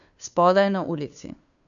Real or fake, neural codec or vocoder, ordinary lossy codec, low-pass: fake; codec, 16 kHz, 2 kbps, FunCodec, trained on Chinese and English, 25 frames a second; none; 7.2 kHz